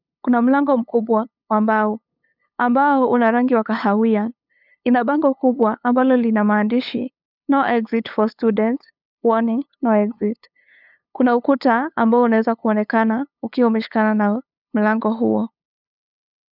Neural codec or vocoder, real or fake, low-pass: codec, 16 kHz, 8 kbps, FunCodec, trained on LibriTTS, 25 frames a second; fake; 5.4 kHz